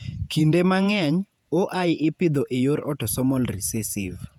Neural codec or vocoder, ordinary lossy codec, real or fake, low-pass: vocoder, 48 kHz, 128 mel bands, Vocos; none; fake; 19.8 kHz